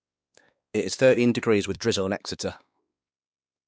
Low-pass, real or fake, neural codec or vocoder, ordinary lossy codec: none; fake; codec, 16 kHz, 2 kbps, X-Codec, WavLM features, trained on Multilingual LibriSpeech; none